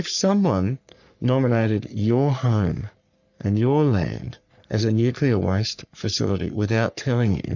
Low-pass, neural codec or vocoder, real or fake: 7.2 kHz; codec, 44.1 kHz, 3.4 kbps, Pupu-Codec; fake